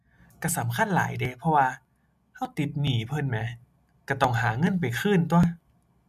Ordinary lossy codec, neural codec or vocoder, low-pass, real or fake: none; none; 14.4 kHz; real